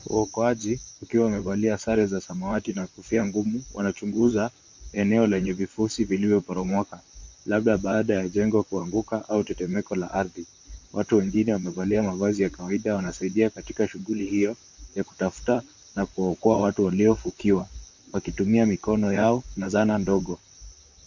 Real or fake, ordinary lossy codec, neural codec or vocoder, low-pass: fake; MP3, 48 kbps; vocoder, 44.1 kHz, 128 mel bands, Pupu-Vocoder; 7.2 kHz